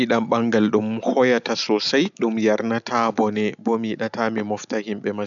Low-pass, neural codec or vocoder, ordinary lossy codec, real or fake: 7.2 kHz; none; none; real